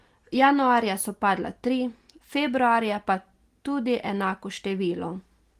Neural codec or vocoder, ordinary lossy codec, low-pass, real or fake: none; Opus, 24 kbps; 14.4 kHz; real